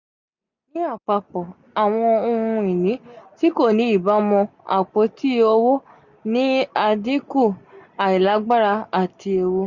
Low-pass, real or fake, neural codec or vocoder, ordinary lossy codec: 7.2 kHz; real; none; none